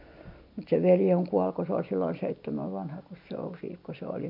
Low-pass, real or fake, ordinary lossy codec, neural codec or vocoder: 5.4 kHz; real; MP3, 48 kbps; none